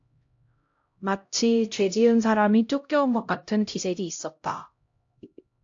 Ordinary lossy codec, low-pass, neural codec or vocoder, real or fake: AAC, 48 kbps; 7.2 kHz; codec, 16 kHz, 0.5 kbps, X-Codec, HuBERT features, trained on LibriSpeech; fake